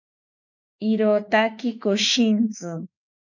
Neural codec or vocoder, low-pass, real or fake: autoencoder, 48 kHz, 32 numbers a frame, DAC-VAE, trained on Japanese speech; 7.2 kHz; fake